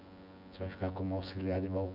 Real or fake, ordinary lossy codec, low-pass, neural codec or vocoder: fake; none; 5.4 kHz; vocoder, 24 kHz, 100 mel bands, Vocos